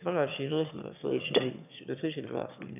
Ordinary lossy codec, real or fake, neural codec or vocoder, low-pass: none; fake; autoencoder, 22.05 kHz, a latent of 192 numbers a frame, VITS, trained on one speaker; 3.6 kHz